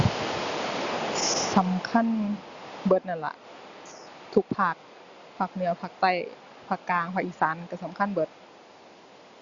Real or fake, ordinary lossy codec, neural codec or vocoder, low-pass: real; Opus, 64 kbps; none; 7.2 kHz